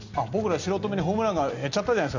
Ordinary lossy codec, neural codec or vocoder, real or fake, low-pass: none; none; real; 7.2 kHz